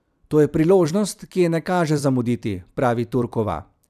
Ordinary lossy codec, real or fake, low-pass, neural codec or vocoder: none; fake; 14.4 kHz; vocoder, 44.1 kHz, 128 mel bands every 256 samples, BigVGAN v2